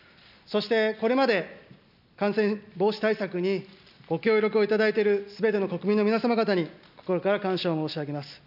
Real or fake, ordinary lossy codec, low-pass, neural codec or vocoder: real; none; 5.4 kHz; none